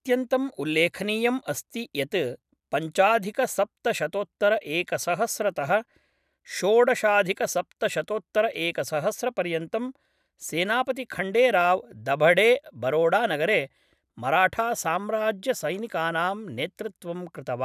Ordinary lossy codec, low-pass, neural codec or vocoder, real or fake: none; 14.4 kHz; none; real